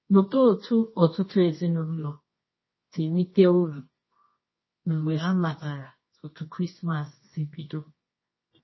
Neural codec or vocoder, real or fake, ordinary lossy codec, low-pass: codec, 24 kHz, 0.9 kbps, WavTokenizer, medium music audio release; fake; MP3, 24 kbps; 7.2 kHz